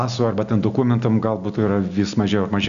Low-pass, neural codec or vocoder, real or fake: 7.2 kHz; none; real